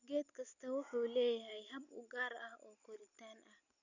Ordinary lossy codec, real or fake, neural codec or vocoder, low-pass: none; real; none; 7.2 kHz